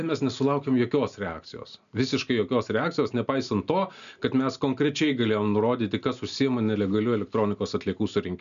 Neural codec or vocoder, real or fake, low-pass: none; real; 7.2 kHz